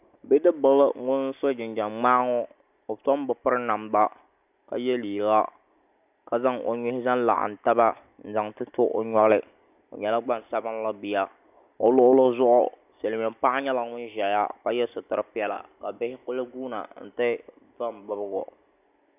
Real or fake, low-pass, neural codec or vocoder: real; 3.6 kHz; none